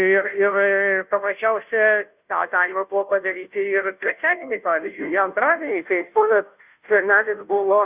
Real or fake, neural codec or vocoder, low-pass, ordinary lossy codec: fake; codec, 16 kHz, 0.5 kbps, FunCodec, trained on Chinese and English, 25 frames a second; 3.6 kHz; Opus, 64 kbps